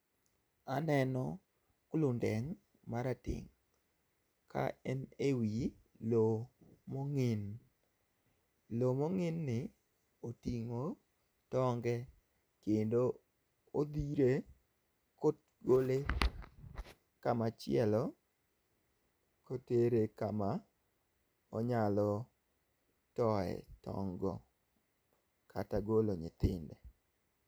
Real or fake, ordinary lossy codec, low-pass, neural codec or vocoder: real; none; none; none